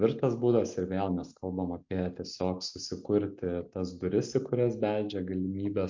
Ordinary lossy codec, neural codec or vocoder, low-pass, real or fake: MP3, 48 kbps; none; 7.2 kHz; real